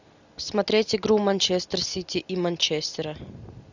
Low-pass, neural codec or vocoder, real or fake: 7.2 kHz; none; real